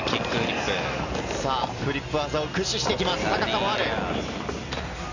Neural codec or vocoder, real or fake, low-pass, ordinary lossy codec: none; real; 7.2 kHz; none